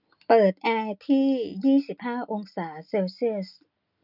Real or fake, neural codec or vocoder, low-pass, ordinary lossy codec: real; none; 5.4 kHz; none